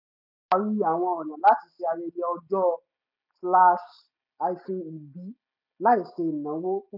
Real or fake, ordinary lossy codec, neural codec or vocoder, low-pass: real; none; none; 5.4 kHz